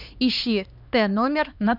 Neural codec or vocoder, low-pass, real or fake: codec, 16 kHz, 2 kbps, X-Codec, HuBERT features, trained on LibriSpeech; 5.4 kHz; fake